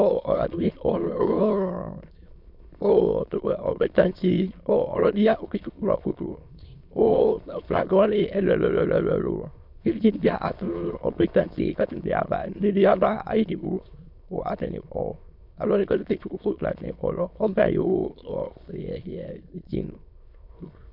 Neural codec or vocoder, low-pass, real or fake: autoencoder, 22.05 kHz, a latent of 192 numbers a frame, VITS, trained on many speakers; 5.4 kHz; fake